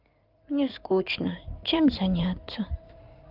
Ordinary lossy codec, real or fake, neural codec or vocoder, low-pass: Opus, 32 kbps; fake; codec, 16 kHz in and 24 kHz out, 2.2 kbps, FireRedTTS-2 codec; 5.4 kHz